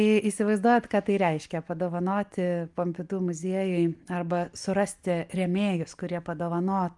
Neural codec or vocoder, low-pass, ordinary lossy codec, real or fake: none; 10.8 kHz; Opus, 32 kbps; real